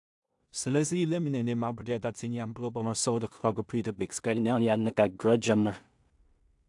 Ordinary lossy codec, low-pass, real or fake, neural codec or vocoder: AAC, 64 kbps; 10.8 kHz; fake; codec, 16 kHz in and 24 kHz out, 0.4 kbps, LongCat-Audio-Codec, two codebook decoder